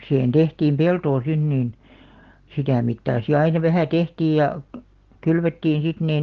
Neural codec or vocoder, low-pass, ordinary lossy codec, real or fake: none; 7.2 kHz; Opus, 16 kbps; real